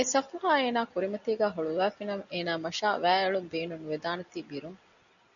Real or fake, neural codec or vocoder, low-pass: real; none; 7.2 kHz